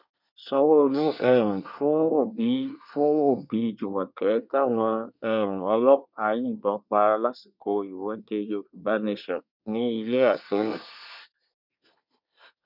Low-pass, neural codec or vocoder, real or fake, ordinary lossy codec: 5.4 kHz; codec, 24 kHz, 1 kbps, SNAC; fake; none